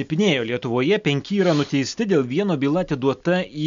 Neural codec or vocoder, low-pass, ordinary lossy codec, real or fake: none; 7.2 kHz; MP3, 64 kbps; real